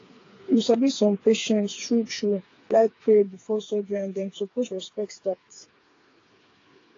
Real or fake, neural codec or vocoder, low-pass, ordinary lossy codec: fake; codec, 16 kHz, 4 kbps, FreqCodec, smaller model; 7.2 kHz; AAC, 32 kbps